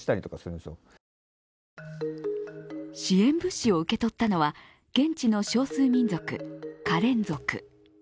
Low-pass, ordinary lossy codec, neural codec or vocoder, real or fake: none; none; none; real